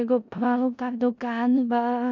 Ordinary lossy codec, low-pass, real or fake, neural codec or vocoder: none; 7.2 kHz; fake; codec, 16 kHz in and 24 kHz out, 0.4 kbps, LongCat-Audio-Codec, four codebook decoder